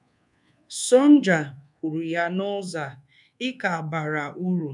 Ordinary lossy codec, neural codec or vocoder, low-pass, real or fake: none; codec, 24 kHz, 1.2 kbps, DualCodec; 10.8 kHz; fake